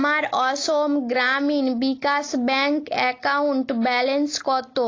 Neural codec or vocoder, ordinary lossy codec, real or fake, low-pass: none; AAC, 32 kbps; real; 7.2 kHz